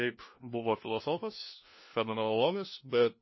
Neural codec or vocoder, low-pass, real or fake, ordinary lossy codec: codec, 16 kHz, 1 kbps, FunCodec, trained on LibriTTS, 50 frames a second; 7.2 kHz; fake; MP3, 24 kbps